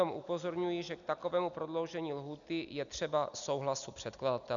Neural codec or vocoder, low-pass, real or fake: none; 7.2 kHz; real